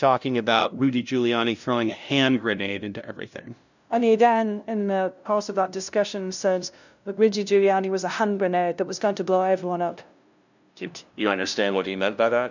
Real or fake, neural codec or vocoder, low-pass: fake; codec, 16 kHz, 0.5 kbps, FunCodec, trained on LibriTTS, 25 frames a second; 7.2 kHz